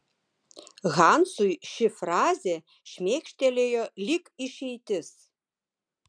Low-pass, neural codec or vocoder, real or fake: 9.9 kHz; none; real